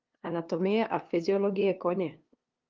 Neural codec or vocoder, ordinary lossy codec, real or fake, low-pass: codec, 16 kHz, 2 kbps, FunCodec, trained on LibriTTS, 25 frames a second; Opus, 32 kbps; fake; 7.2 kHz